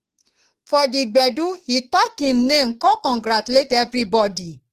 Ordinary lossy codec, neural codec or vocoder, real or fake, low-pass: Opus, 16 kbps; autoencoder, 48 kHz, 32 numbers a frame, DAC-VAE, trained on Japanese speech; fake; 14.4 kHz